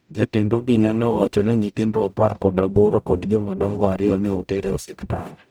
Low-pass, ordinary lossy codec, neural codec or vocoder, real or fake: none; none; codec, 44.1 kHz, 0.9 kbps, DAC; fake